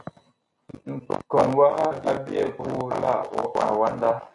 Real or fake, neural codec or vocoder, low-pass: real; none; 10.8 kHz